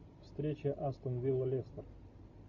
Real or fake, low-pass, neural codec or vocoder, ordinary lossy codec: real; 7.2 kHz; none; MP3, 64 kbps